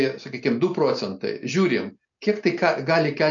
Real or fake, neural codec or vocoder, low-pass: real; none; 7.2 kHz